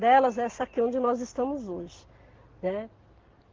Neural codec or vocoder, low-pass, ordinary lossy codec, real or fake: none; 7.2 kHz; Opus, 16 kbps; real